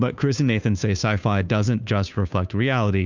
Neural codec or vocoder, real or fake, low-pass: codec, 16 kHz, 2 kbps, FunCodec, trained on Chinese and English, 25 frames a second; fake; 7.2 kHz